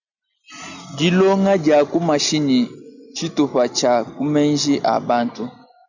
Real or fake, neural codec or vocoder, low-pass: real; none; 7.2 kHz